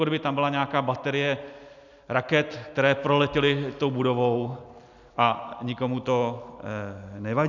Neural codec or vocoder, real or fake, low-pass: none; real; 7.2 kHz